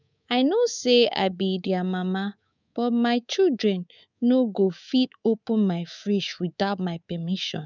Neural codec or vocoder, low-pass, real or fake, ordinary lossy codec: codec, 24 kHz, 3.1 kbps, DualCodec; 7.2 kHz; fake; none